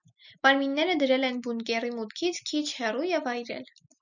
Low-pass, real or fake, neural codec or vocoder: 7.2 kHz; real; none